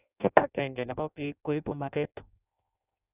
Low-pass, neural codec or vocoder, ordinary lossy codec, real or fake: 3.6 kHz; codec, 16 kHz in and 24 kHz out, 0.6 kbps, FireRedTTS-2 codec; none; fake